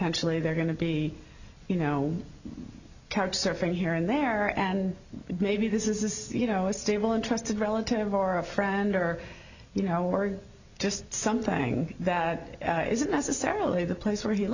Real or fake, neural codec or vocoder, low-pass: real; none; 7.2 kHz